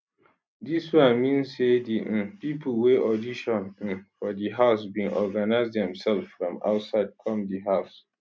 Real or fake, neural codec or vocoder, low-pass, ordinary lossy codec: real; none; none; none